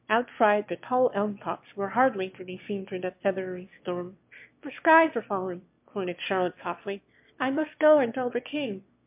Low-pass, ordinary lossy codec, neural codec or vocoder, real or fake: 3.6 kHz; MP3, 32 kbps; autoencoder, 22.05 kHz, a latent of 192 numbers a frame, VITS, trained on one speaker; fake